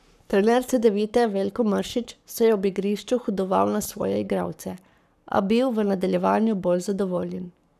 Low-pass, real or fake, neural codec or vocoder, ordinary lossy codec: 14.4 kHz; fake; codec, 44.1 kHz, 7.8 kbps, Pupu-Codec; none